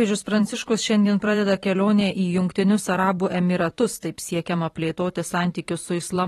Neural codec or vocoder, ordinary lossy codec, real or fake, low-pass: vocoder, 44.1 kHz, 128 mel bands every 512 samples, BigVGAN v2; AAC, 32 kbps; fake; 19.8 kHz